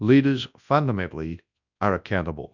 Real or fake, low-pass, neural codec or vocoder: fake; 7.2 kHz; codec, 24 kHz, 0.9 kbps, WavTokenizer, large speech release